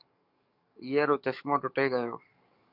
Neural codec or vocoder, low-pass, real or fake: codec, 44.1 kHz, 7.8 kbps, DAC; 5.4 kHz; fake